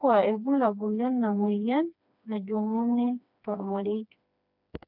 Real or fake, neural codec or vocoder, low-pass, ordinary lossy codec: fake; codec, 16 kHz, 2 kbps, FreqCodec, smaller model; 5.4 kHz; none